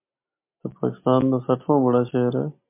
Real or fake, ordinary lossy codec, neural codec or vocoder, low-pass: real; MP3, 24 kbps; none; 3.6 kHz